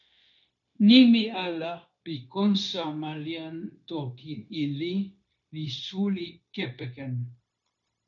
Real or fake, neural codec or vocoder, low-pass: fake; codec, 16 kHz, 0.9 kbps, LongCat-Audio-Codec; 7.2 kHz